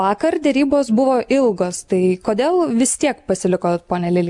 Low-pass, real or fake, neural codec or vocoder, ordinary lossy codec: 10.8 kHz; real; none; MP3, 96 kbps